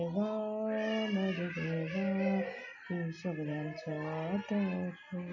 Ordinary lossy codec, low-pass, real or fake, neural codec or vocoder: none; 7.2 kHz; real; none